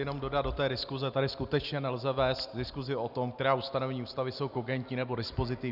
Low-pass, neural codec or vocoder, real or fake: 5.4 kHz; none; real